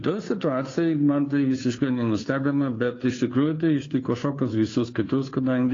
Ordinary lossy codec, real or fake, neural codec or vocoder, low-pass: AAC, 32 kbps; fake; codec, 16 kHz, 2 kbps, FunCodec, trained on Chinese and English, 25 frames a second; 7.2 kHz